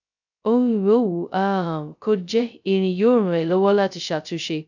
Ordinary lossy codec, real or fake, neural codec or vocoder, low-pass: none; fake; codec, 16 kHz, 0.2 kbps, FocalCodec; 7.2 kHz